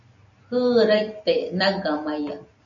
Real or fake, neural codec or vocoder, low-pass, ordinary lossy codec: real; none; 7.2 kHz; MP3, 96 kbps